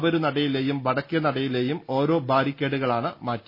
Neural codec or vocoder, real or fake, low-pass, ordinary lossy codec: none; real; 5.4 kHz; MP3, 24 kbps